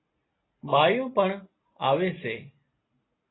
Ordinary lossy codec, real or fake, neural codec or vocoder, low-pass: AAC, 16 kbps; real; none; 7.2 kHz